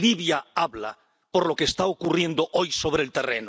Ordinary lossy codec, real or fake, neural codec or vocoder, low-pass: none; real; none; none